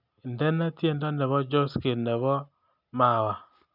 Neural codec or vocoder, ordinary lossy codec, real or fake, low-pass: none; none; real; 5.4 kHz